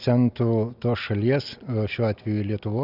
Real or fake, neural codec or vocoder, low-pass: real; none; 5.4 kHz